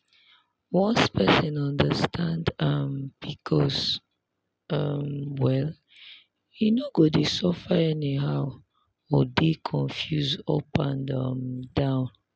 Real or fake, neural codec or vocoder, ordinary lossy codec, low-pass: real; none; none; none